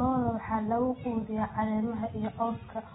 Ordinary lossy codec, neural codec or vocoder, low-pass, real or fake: AAC, 16 kbps; none; 19.8 kHz; real